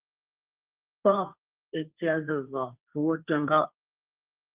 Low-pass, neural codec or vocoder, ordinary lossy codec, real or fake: 3.6 kHz; codec, 16 kHz, 1.1 kbps, Voila-Tokenizer; Opus, 24 kbps; fake